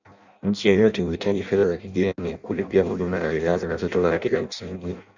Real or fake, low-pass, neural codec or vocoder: fake; 7.2 kHz; codec, 16 kHz in and 24 kHz out, 0.6 kbps, FireRedTTS-2 codec